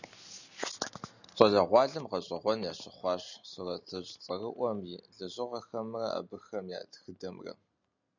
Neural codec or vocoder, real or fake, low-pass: none; real; 7.2 kHz